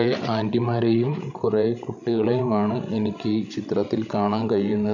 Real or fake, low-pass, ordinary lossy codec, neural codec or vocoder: fake; 7.2 kHz; none; codec, 16 kHz, 16 kbps, FreqCodec, larger model